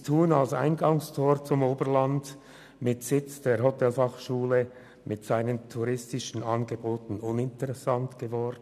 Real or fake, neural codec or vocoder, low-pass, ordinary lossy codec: real; none; 14.4 kHz; none